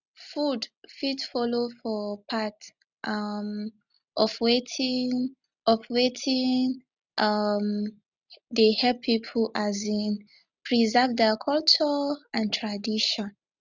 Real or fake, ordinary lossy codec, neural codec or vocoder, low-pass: real; none; none; 7.2 kHz